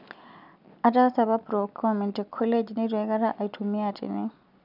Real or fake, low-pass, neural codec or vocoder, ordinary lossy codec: real; 5.4 kHz; none; none